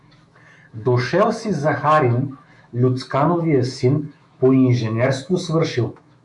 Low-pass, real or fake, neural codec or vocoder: 10.8 kHz; fake; autoencoder, 48 kHz, 128 numbers a frame, DAC-VAE, trained on Japanese speech